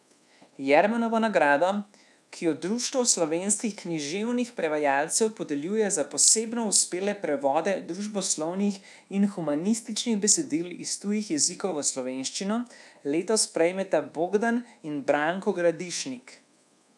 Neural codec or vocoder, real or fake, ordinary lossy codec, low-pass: codec, 24 kHz, 1.2 kbps, DualCodec; fake; none; none